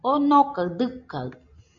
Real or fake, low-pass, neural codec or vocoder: real; 7.2 kHz; none